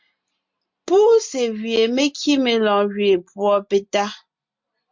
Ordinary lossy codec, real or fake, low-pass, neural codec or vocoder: MP3, 64 kbps; real; 7.2 kHz; none